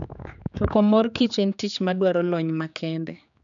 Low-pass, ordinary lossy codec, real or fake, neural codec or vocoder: 7.2 kHz; none; fake; codec, 16 kHz, 4 kbps, X-Codec, HuBERT features, trained on general audio